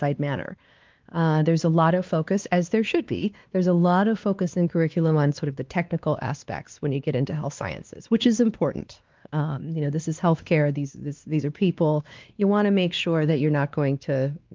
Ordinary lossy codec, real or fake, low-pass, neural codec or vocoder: Opus, 32 kbps; fake; 7.2 kHz; codec, 16 kHz, 2 kbps, X-Codec, WavLM features, trained on Multilingual LibriSpeech